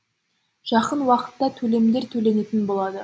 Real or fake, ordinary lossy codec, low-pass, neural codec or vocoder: real; none; none; none